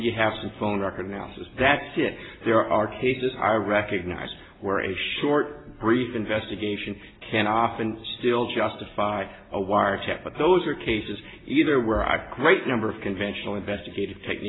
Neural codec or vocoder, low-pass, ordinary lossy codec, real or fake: none; 7.2 kHz; AAC, 16 kbps; real